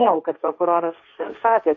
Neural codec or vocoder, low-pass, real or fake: codec, 16 kHz, 1.1 kbps, Voila-Tokenizer; 7.2 kHz; fake